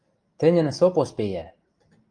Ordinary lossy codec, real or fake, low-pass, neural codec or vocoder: Opus, 32 kbps; real; 9.9 kHz; none